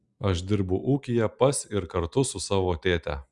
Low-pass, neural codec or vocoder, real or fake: 10.8 kHz; none; real